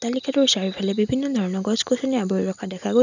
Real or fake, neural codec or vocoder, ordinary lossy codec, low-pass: real; none; none; 7.2 kHz